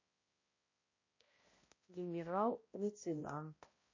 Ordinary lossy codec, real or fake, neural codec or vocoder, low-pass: MP3, 32 kbps; fake; codec, 16 kHz, 0.5 kbps, X-Codec, HuBERT features, trained on balanced general audio; 7.2 kHz